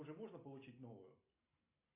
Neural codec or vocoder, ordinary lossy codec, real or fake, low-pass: none; AAC, 24 kbps; real; 3.6 kHz